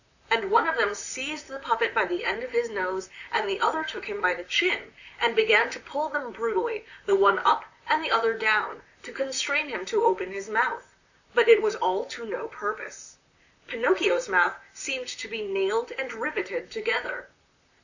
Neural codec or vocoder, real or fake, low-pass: vocoder, 44.1 kHz, 128 mel bands, Pupu-Vocoder; fake; 7.2 kHz